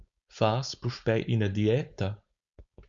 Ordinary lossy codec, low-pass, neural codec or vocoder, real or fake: Opus, 64 kbps; 7.2 kHz; codec, 16 kHz, 4.8 kbps, FACodec; fake